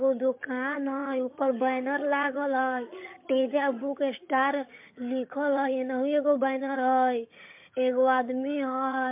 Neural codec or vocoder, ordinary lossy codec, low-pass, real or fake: vocoder, 22.05 kHz, 80 mel bands, HiFi-GAN; MP3, 32 kbps; 3.6 kHz; fake